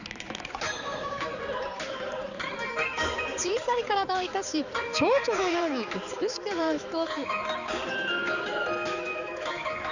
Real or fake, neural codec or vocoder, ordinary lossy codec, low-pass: fake; codec, 16 kHz, 4 kbps, X-Codec, HuBERT features, trained on balanced general audio; none; 7.2 kHz